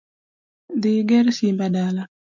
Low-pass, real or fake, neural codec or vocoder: 7.2 kHz; real; none